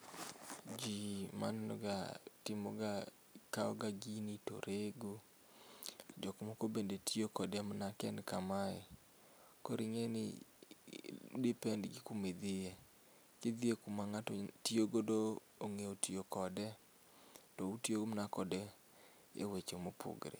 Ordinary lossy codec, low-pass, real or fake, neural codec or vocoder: none; none; real; none